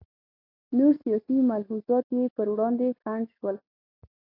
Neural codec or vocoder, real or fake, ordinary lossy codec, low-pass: none; real; AAC, 32 kbps; 5.4 kHz